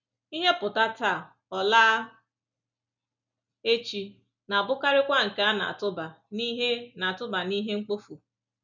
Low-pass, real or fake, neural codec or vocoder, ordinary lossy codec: 7.2 kHz; real; none; none